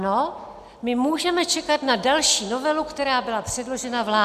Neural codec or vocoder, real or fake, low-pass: none; real; 14.4 kHz